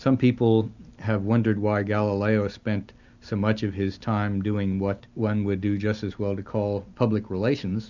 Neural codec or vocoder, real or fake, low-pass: none; real; 7.2 kHz